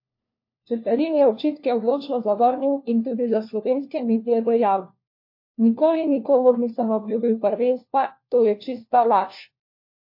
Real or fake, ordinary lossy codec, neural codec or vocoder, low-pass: fake; MP3, 32 kbps; codec, 16 kHz, 1 kbps, FunCodec, trained on LibriTTS, 50 frames a second; 5.4 kHz